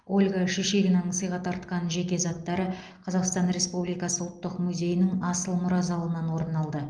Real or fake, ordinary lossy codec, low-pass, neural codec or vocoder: real; Opus, 32 kbps; 9.9 kHz; none